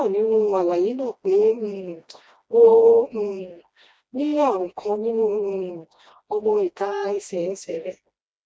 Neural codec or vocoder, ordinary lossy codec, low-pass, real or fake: codec, 16 kHz, 1 kbps, FreqCodec, smaller model; none; none; fake